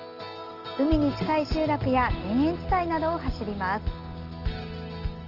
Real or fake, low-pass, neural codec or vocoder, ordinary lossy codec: real; 5.4 kHz; none; Opus, 16 kbps